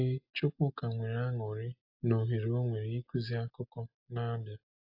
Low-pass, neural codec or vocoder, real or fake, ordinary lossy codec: 5.4 kHz; none; real; AAC, 48 kbps